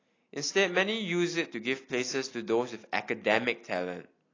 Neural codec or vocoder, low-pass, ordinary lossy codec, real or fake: none; 7.2 kHz; AAC, 32 kbps; real